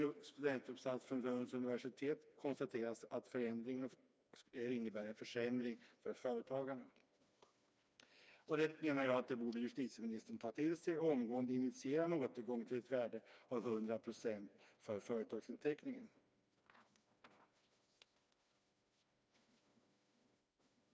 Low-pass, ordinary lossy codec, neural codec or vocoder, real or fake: none; none; codec, 16 kHz, 2 kbps, FreqCodec, smaller model; fake